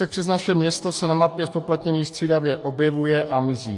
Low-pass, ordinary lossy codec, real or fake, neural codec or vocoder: 10.8 kHz; AAC, 64 kbps; fake; codec, 44.1 kHz, 2.6 kbps, DAC